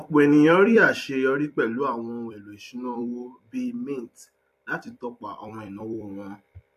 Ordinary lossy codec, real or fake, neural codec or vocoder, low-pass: MP3, 64 kbps; fake; vocoder, 44.1 kHz, 128 mel bands every 256 samples, BigVGAN v2; 14.4 kHz